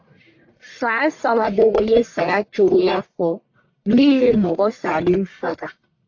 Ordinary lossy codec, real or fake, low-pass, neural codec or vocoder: AAC, 48 kbps; fake; 7.2 kHz; codec, 44.1 kHz, 1.7 kbps, Pupu-Codec